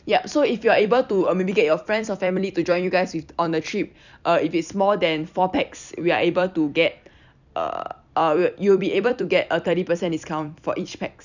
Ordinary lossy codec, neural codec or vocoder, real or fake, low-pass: none; vocoder, 44.1 kHz, 128 mel bands every 256 samples, BigVGAN v2; fake; 7.2 kHz